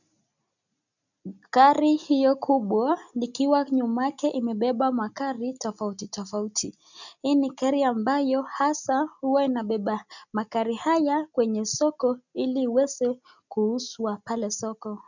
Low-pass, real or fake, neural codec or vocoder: 7.2 kHz; real; none